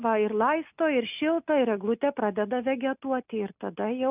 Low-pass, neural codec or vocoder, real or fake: 3.6 kHz; none; real